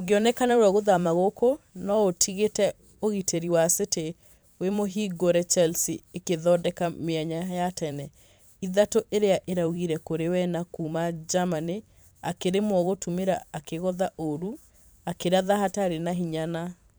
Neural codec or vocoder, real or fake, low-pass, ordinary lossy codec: vocoder, 44.1 kHz, 128 mel bands every 512 samples, BigVGAN v2; fake; none; none